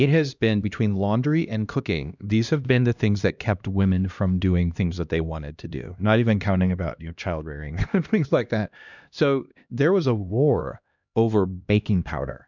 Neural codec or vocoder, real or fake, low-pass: codec, 16 kHz, 1 kbps, X-Codec, HuBERT features, trained on LibriSpeech; fake; 7.2 kHz